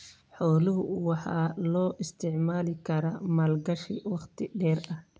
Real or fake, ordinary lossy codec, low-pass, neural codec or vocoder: real; none; none; none